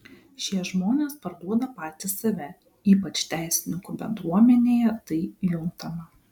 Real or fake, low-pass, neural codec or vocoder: real; 19.8 kHz; none